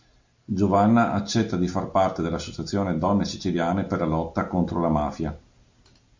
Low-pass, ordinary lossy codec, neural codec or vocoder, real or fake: 7.2 kHz; MP3, 48 kbps; none; real